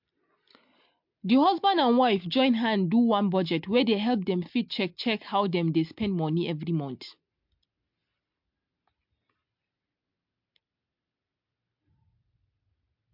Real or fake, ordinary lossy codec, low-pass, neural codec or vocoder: real; MP3, 48 kbps; 5.4 kHz; none